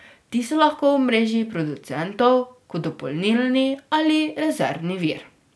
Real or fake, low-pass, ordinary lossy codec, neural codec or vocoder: real; none; none; none